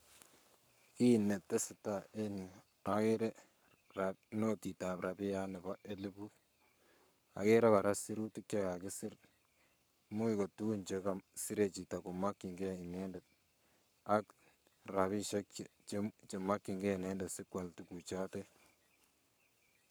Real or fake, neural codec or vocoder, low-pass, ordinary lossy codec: fake; codec, 44.1 kHz, 7.8 kbps, Pupu-Codec; none; none